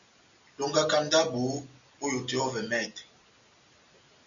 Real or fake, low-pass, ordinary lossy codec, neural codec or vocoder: real; 7.2 kHz; MP3, 96 kbps; none